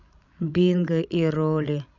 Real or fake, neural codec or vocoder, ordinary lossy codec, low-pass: fake; codec, 16 kHz, 16 kbps, FreqCodec, larger model; none; 7.2 kHz